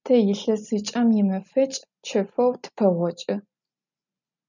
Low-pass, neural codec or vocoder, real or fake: 7.2 kHz; none; real